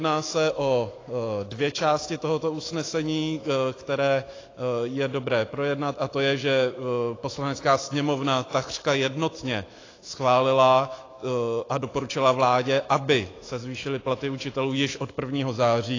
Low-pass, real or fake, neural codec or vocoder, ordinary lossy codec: 7.2 kHz; fake; autoencoder, 48 kHz, 128 numbers a frame, DAC-VAE, trained on Japanese speech; AAC, 32 kbps